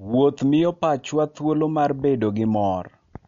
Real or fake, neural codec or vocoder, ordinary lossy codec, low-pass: real; none; MP3, 48 kbps; 7.2 kHz